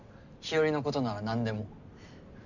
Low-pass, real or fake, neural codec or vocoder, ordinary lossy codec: 7.2 kHz; real; none; none